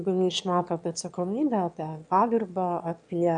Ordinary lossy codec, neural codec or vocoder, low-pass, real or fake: AAC, 64 kbps; autoencoder, 22.05 kHz, a latent of 192 numbers a frame, VITS, trained on one speaker; 9.9 kHz; fake